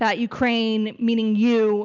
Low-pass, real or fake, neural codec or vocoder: 7.2 kHz; real; none